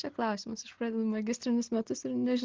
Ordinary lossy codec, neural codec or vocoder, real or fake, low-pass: Opus, 16 kbps; none; real; 7.2 kHz